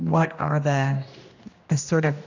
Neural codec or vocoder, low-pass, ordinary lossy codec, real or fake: codec, 16 kHz, 1 kbps, X-Codec, HuBERT features, trained on general audio; 7.2 kHz; AAC, 48 kbps; fake